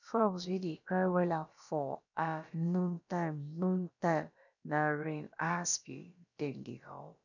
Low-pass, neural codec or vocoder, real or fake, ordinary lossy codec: 7.2 kHz; codec, 16 kHz, about 1 kbps, DyCAST, with the encoder's durations; fake; none